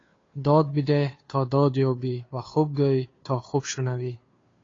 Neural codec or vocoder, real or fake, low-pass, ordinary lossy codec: codec, 16 kHz, 2 kbps, FunCodec, trained on Chinese and English, 25 frames a second; fake; 7.2 kHz; AAC, 32 kbps